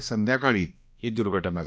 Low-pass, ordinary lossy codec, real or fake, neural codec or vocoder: none; none; fake; codec, 16 kHz, 1 kbps, X-Codec, HuBERT features, trained on balanced general audio